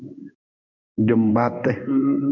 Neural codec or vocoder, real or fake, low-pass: codec, 16 kHz in and 24 kHz out, 1 kbps, XY-Tokenizer; fake; 7.2 kHz